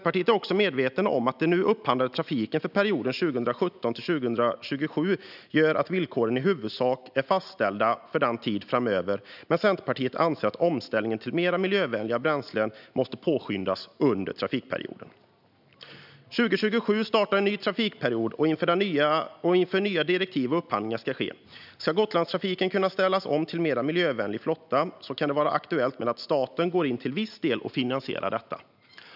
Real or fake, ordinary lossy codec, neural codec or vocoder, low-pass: real; none; none; 5.4 kHz